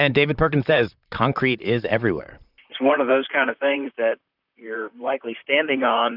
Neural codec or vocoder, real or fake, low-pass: vocoder, 44.1 kHz, 128 mel bands, Pupu-Vocoder; fake; 5.4 kHz